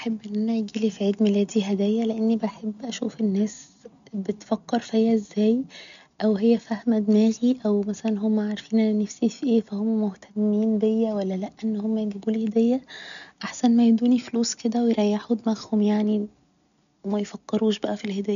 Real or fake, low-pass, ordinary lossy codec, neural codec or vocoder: real; 7.2 kHz; none; none